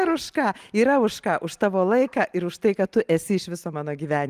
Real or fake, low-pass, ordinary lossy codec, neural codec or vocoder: real; 14.4 kHz; Opus, 32 kbps; none